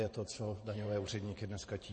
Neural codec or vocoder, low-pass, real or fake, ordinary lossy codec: vocoder, 44.1 kHz, 128 mel bands every 512 samples, BigVGAN v2; 10.8 kHz; fake; MP3, 32 kbps